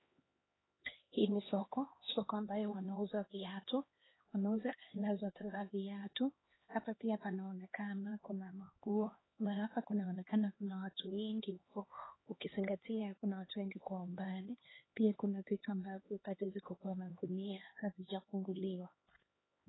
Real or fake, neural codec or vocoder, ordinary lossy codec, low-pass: fake; codec, 16 kHz, 2 kbps, X-Codec, HuBERT features, trained on LibriSpeech; AAC, 16 kbps; 7.2 kHz